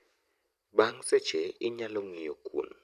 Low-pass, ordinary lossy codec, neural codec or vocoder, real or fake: 14.4 kHz; none; vocoder, 44.1 kHz, 128 mel bands every 256 samples, BigVGAN v2; fake